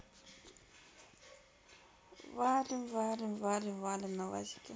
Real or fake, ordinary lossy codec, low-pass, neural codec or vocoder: real; none; none; none